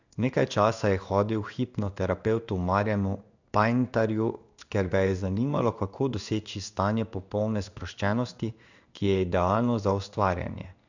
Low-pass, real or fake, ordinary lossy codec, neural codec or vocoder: 7.2 kHz; fake; none; codec, 16 kHz in and 24 kHz out, 1 kbps, XY-Tokenizer